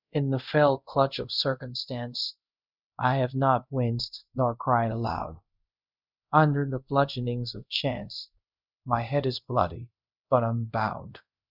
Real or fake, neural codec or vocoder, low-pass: fake; codec, 24 kHz, 0.5 kbps, DualCodec; 5.4 kHz